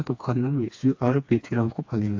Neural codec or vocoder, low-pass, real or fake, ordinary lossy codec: codec, 16 kHz, 2 kbps, FreqCodec, smaller model; 7.2 kHz; fake; AAC, 48 kbps